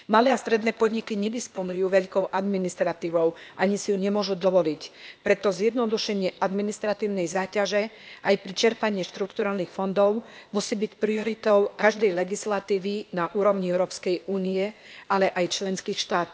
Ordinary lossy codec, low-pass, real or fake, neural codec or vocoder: none; none; fake; codec, 16 kHz, 0.8 kbps, ZipCodec